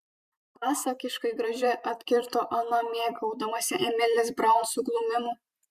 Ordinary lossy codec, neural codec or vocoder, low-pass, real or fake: Opus, 64 kbps; vocoder, 44.1 kHz, 128 mel bands every 512 samples, BigVGAN v2; 14.4 kHz; fake